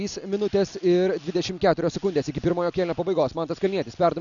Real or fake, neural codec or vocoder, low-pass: real; none; 7.2 kHz